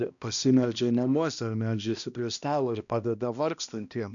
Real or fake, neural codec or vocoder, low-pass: fake; codec, 16 kHz, 1 kbps, X-Codec, HuBERT features, trained on balanced general audio; 7.2 kHz